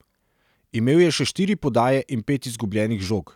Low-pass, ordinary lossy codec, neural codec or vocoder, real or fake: 19.8 kHz; none; none; real